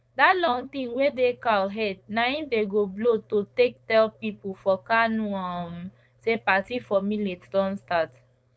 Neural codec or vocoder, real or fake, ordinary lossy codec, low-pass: codec, 16 kHz, 4.8 kbps, FACodec; fake; none; none